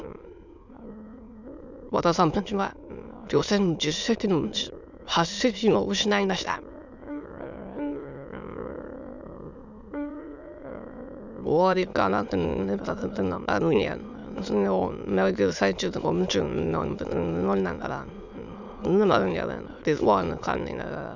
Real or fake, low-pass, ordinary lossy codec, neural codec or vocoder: fake; 7.2 kHz; none; autoencoder, 22.05 kHz, a latent of 192 numbers a frame, VITS, trained on many speakers